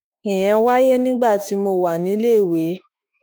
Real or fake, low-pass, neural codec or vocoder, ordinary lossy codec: fake; none; autoencoder, 48 kHz, 32 numbers a frame, DAC-VAE, trained on Japanese speech; none